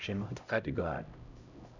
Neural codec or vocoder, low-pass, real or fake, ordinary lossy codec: codec, 16 kHz, 0.5 kbps, X-Codec, HuBERT features, trained on LibriSpeech; 7.2 kHz; fake; none